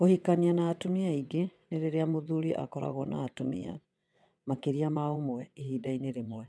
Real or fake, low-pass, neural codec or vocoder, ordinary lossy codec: fake; none; vocoder, 22.05 kHz, 80 mel bands, WaveNeXt; none